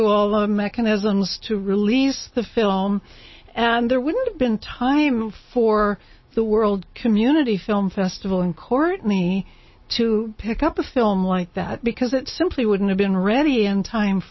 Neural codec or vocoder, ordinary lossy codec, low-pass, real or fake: none; MP3, 24 kbps; 7.2 kHz; real